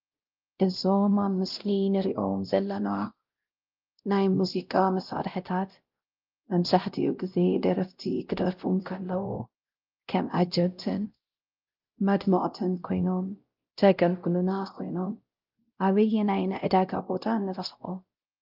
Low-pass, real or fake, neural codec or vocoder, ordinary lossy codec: 5.4 kHz; fake; codec, 16 kHz, 0.5 kbps, X-Codec, WavLM features, trained on Multilingual LibriSpeech; Opus, 32 kbps